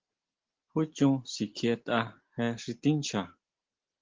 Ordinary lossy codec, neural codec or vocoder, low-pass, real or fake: Opus, 16 kbps; none; 7.2 kHz; real